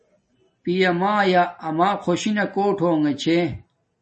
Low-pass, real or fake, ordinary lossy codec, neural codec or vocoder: 10.8 kHz; real; MP3, 32 kbps; none